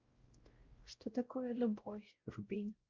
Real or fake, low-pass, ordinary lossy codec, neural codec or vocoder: fake; 7.2 kHz; Opus, 32 kbps; codec, 16 kHz, 0.5 kbps, X-Codec, WavLM features, trained on Multilingual LibriSpeech